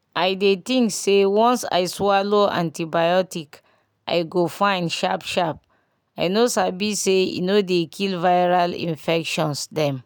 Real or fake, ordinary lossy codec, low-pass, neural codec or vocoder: real; none; none; none